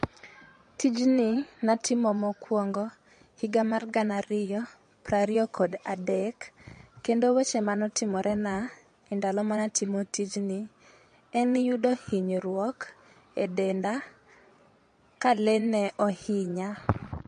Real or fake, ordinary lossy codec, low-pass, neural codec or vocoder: fake; MP3, 48 kbps; 9.9 kHz; vocoder, 22.05 kHz, 80 mel bands, Vocos